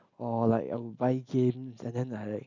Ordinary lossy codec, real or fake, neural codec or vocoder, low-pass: none; real; none; 7.2 kHz